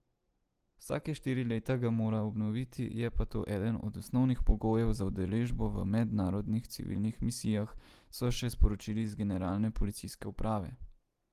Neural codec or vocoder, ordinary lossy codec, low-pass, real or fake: none; Opus, 24 kbps; 14.4 kHz; real